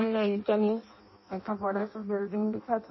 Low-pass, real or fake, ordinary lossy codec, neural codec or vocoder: 7.2 kHz; fake; MP3, 24 kbps; codec, 16 kHz in and 24 kHz out, 0.6 kbps, FireRedTTS-2 codec